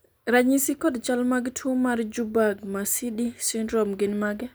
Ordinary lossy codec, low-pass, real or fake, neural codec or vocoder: none; none; real; none